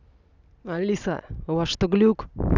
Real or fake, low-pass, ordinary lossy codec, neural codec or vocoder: real; 7.2 kHz; none; none